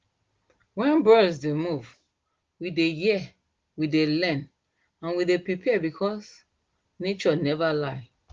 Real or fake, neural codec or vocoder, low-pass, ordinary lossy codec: real; none; 7.2 kHz; Opus, 16 kbps